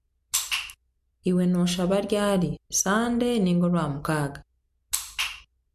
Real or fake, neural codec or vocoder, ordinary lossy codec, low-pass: real; none; MP3, 64 kbps; 14.4 kHz